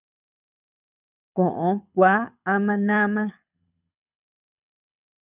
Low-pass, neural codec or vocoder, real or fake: 3.6 kHz; codec, 24 kHz, 6 kbps, HILCodec; fake